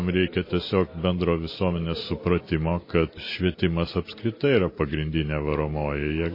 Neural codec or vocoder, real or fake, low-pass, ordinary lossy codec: none; real; 5.4 kHz; MP3, 24 kbps